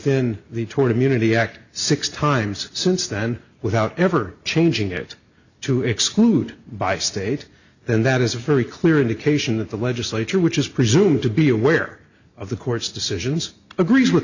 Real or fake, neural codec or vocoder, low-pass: real; none; 7.2 kHz